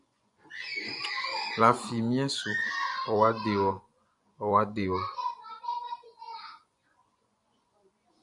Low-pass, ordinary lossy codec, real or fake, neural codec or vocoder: 10.8 kHz; MP3, 64 kbps; fake; vocoder, 24 kHz, 100 mel bands, Vocos